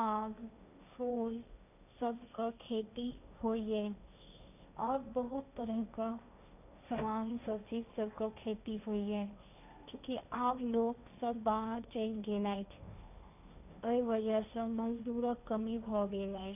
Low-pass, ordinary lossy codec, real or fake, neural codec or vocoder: 3.6 kHz; none; fake; codec, 16 kHz, 1.1 kbps, Voila-Tokenizer